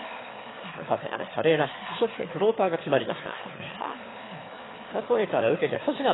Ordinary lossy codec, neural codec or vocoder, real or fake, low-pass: AAC, 16 kbps; autoencoder, 22.05 kHz, a latent of 192 numbers a frame, VITS, trained on one speaker; fake; 7.2 kHz